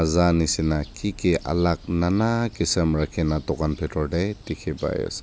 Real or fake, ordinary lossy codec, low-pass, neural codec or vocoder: real; none; none; none